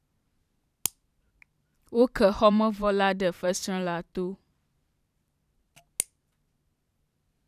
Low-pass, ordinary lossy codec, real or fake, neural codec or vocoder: 14.4 kHz; none; fake; vocoder, 44.1 kHz, 128 mel bands every 512 samples, BigVGAN v2